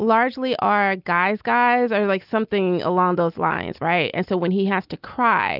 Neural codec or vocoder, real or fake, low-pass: none; real; 5.4 kHz